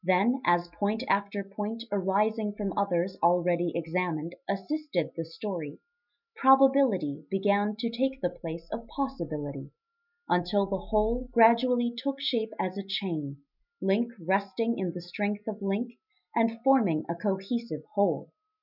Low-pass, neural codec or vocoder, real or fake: 5.4 kHz; none; real